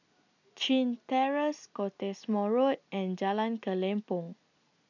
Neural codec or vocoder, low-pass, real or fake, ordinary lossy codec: none; 7.2 kHz; real; none